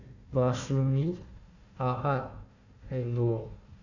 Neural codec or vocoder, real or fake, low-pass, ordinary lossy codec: codec, 16 kHz, 1 kbps, FunCodec, trained on Chinese and English, 50 frames a second; fake; 7.2 kHz; none